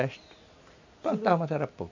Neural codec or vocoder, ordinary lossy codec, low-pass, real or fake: none; MP3, 64 kbps; 7.2 kHz; real